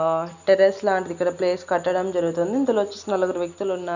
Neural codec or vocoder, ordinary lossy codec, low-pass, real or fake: none; none; 7.2 kHz; real